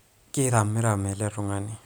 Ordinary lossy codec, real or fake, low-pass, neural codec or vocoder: none; real; none; none